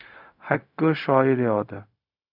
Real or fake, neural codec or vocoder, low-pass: fake; codec, 16 kHz, 0.4 kbps, LongCat-Audio-Codec; 5.4 kHz